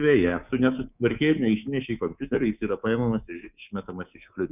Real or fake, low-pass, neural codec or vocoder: fake; 3.6 kHz; codec, 24 kHz, 3.1 kbps, DualCodec